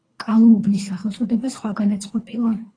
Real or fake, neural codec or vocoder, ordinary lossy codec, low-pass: fake; codec, 24 kHz, 3 kbps, HILCodec; AAC, 32 kbps; 9.9 kHz